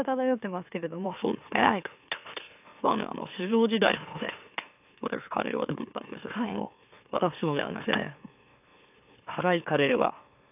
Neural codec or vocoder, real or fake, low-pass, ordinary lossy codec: autoencoder, 44.1 kHz, a latent of 192 numbers a frame, MeloTTS; fake; 3.6 kHz; AAC, 32 kbps